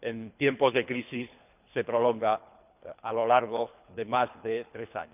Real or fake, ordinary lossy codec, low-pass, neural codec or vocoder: fake; none; 3.6 kHz; codec, 24 kHz, 3 kbps, HILCodec